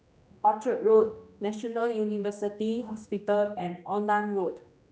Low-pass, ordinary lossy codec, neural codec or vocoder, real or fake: none; none; codec, 16 kHz, 1 kbps, X-Codec, HuBERT features, trained on general audio; fake